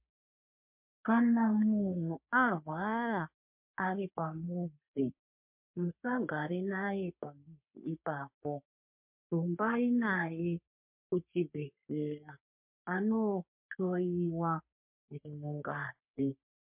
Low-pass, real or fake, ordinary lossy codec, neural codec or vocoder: 3.6 kHz; fake; MP3, 32 kbps; codec, 44.1 kHz, 3.4 kbps, Pupu-Codec